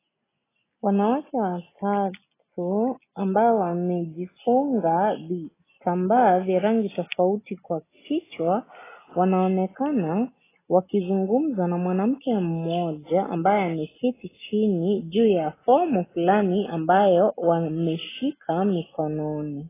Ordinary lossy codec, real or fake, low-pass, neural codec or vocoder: AAC, 16 kbps; real; 3.6 kHz; none